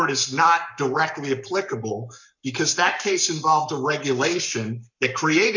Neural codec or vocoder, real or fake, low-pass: codec, 44.1 kHz, 7.8 kbps, Pupu-Codec; fake; 7.2 kHz